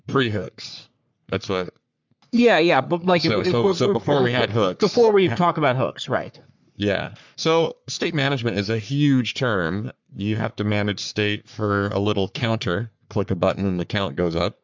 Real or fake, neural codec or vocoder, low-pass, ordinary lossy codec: fake; codec, 44.1 kHz, 3.4 kbps, Pupu-Codec; 7.2 kHz; MP3, 64 kbps